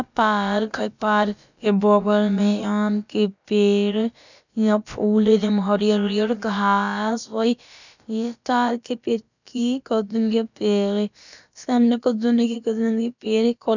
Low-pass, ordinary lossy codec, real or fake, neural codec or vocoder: 7.2 kHz; none; fake; codec, 16 kHz, about 1 kbps, DyCAST, with the encoder's durations